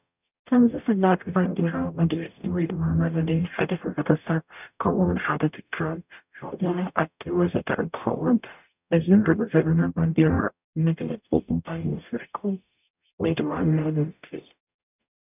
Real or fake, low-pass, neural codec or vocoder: fake; 3.6 kHz; codec, 44.1 kHz, 0.9 kbps, DAC